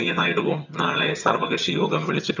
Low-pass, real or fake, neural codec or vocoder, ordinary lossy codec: 7.2 kHz; fake; vocoder, 22.05 kHz, 80 mel bands, HiFi-GAN; none